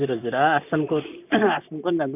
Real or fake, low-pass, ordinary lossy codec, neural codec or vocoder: fake; 3.6 kHz; none; vocoder, 44.1 kHz, 128 mel bands, Pupu-Vocoder